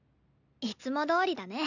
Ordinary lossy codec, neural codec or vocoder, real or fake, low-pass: none; none; real; 7.2 kHz